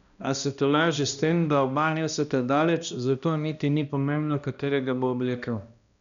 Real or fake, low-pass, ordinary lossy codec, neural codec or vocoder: fake; 7.2 kHz; none; codec, 16 kHz, 1 kbps, X-Codec, HuBERT features, trained on balanced general audio